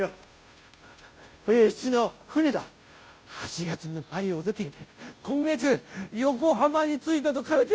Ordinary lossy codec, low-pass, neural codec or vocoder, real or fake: none; none; codec, 16 kHz, 0.5 kbps, FunCodec, trained on Chinese and English, 25 frames a second; fake